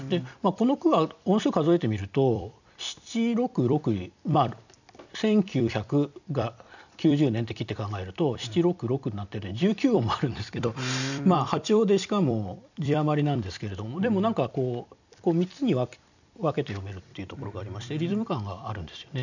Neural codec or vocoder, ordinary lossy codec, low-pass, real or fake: vocoder, 44.1 kHz, 128 mel bands every 256 samples, BigVGAN v2; none; 7.2 kHz; fake